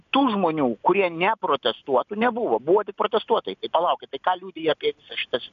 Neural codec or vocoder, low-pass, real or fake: none; 7.2 kHz; real